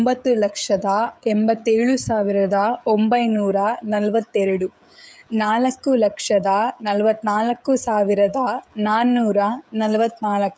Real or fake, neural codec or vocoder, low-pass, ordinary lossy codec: fake; codec, 16 kHz, 16 kbps, FreqCodec, smaller model; none; none